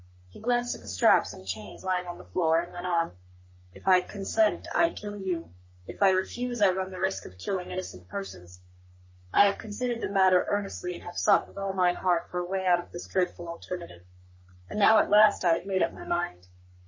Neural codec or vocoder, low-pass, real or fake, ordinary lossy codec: codec, 44.1 kHz, 3.4 kbps, Pupu-Codec; 7.2 kHz; fake; MP3, 32 kbps